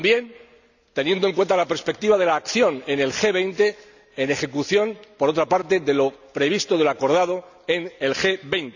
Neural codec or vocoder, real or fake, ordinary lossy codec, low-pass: none; real; none; 7.2 kHz